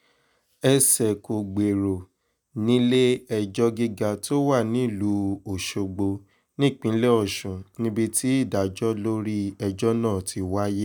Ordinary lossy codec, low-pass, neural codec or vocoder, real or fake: none; none; none; real